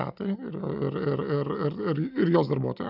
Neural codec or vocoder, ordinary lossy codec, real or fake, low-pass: none; AAC, 48 kbps; real; 5.4 kHz